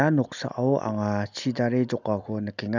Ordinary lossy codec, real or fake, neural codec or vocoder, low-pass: none; real; none; 7.2 kHz